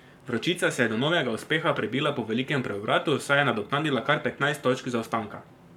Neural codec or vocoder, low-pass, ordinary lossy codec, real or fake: codec, 44.1 kHz, 7.8 kbps, Pupu-Codec; 19.8 kHz; none; fake